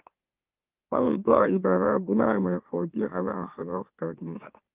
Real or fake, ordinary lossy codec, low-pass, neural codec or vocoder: fake; Opus, 24 kbps; 3.6 kHz; autoencoder, 44.1 kHz, a latent of 192 numbers a frame, MeloTTS